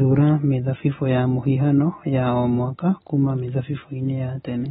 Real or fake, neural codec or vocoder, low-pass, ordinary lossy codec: real; none; 19.8 kHz; AAC, 16 kbps